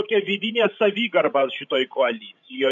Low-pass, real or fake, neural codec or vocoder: 7.2 kHz; fake; codec, 16 kHz, 16 kbps, FreqCodec, larger model